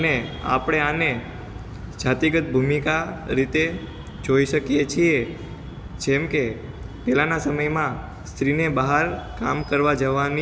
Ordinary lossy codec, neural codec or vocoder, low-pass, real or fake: none; none; none; real